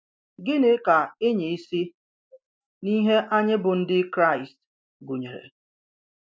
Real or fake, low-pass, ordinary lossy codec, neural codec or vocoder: real; none; none; none